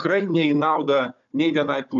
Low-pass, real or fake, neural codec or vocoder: 7.2 kHz; fake; codec, 16 kHz, 4 kbps, FunCodec, trained on Chinese and English, 50 frames a second